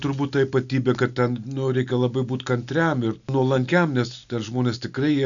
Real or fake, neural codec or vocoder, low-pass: real; none; 7.2 kHz